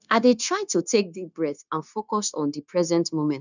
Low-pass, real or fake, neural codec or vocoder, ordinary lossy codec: 7.2 kHz; fake; codec, 16 kHz, 0.9 kbps, LongCat-Audio-Codec; none